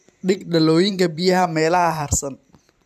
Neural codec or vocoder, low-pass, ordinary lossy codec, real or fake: vocoder, 44.1 kHz, 128 mel bands every 512 samples, BigVGAN v2; 14.4 kHz; none; fake